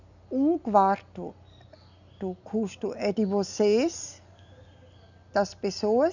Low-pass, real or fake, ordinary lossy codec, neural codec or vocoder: 7.2 kHz; real; none; none